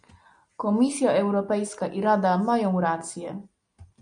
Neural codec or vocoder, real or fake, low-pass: none; real; 9.9 kHz